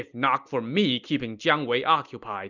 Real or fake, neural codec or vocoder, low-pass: real; none; 7.2 kHz